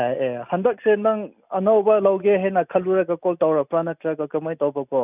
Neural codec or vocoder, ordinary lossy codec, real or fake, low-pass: none; none; real; 3.6 kHz